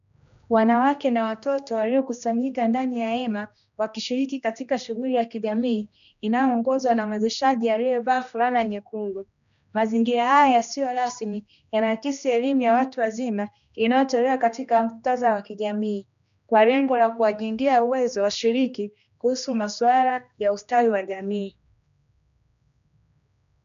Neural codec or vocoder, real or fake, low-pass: codec, 16 kHz, 1 kbps, X-Codec, HuBERT features, trained on general audio; fake; 7.2 kHz